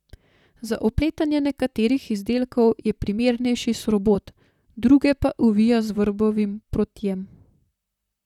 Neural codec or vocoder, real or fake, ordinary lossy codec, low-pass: vocoder, 44.1 kHz, 128 mel bands, Pupu-Vocoder; fake; none; 19.8 kHz